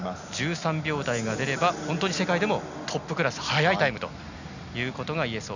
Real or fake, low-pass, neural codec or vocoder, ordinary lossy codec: real; 7.2 kHz; none; none